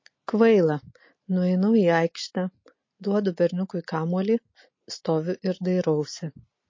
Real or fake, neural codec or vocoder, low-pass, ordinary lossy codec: real; none; 7.2 kHz; MP3, 32 kbps